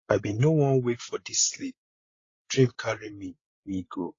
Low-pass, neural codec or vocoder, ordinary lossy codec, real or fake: 7.2 kHz; codec, 16 kHz, 16 kbps, FreqCodec, larger model; AAC, 32 kbps; fake